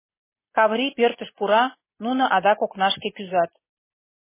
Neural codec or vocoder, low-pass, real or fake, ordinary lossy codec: none; 3.6 kHz; real; MP3, 16 kbps